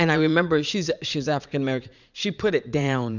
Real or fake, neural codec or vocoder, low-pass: fake; vocoder, 44.1 kHz, 80 mel bands, Vocos; 7.2 kHz